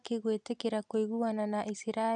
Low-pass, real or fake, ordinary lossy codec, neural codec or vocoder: 9.9 kHz; real; none; none